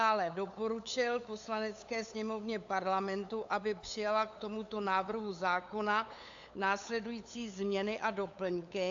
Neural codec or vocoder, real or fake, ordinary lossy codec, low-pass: codec, 16 kHz, 8 kbps, FunCodec, trained on LibriTTS, 25 frames a second; fake; AAC, 64 kbps; 7.2 kHz